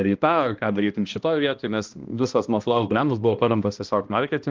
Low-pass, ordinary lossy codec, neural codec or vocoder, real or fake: 7.2 kHz; Opus, 24 kbps; codec, 16 kHz, 1 kbps, X-Codec, HuBERT features, trained on balanced general audio; fake